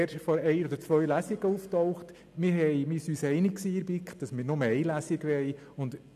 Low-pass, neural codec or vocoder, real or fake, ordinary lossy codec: 14.4 kHz; none; real; none